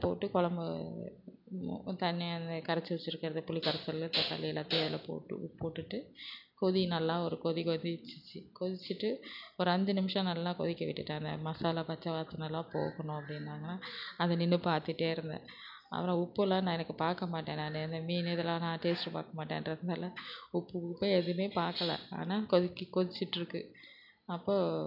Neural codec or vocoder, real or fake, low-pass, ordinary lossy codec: none; real; 5.4 kHz; none